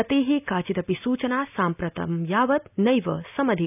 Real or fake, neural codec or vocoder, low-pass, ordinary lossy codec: real; none; 3.6 kHz; none